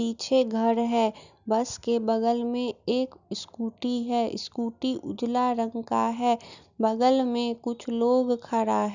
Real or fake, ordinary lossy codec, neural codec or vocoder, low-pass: real; none; none; 7.2 kHz